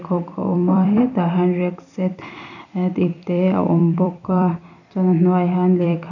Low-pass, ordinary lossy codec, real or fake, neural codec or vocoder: 7.2 kHz; none; fake; vocoder, 44.1 kHz, 128 mel bands every 256 samples, BigVGAN v2